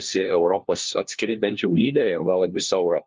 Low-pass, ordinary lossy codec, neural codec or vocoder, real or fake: 7.2 kHz; Opus, 24 kbps; codec, 16 kHz, 1 kbps, FunCodec, trained on LibriTTS, 50 frames a second; fake